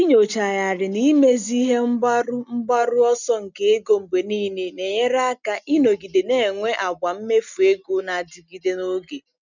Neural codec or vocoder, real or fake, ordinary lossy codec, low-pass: none; real; none; 7.2 kHz